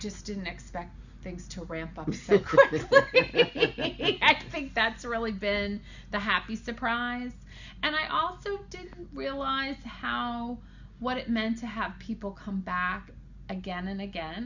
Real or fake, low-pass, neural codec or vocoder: real; 7.2 kHz; none